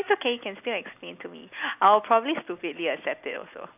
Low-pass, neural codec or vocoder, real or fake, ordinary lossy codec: 3.6 kHz; none; real; none